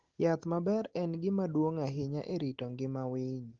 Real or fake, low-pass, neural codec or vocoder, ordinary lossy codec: real; 7.2 kHz; none; Opus, 16 kbps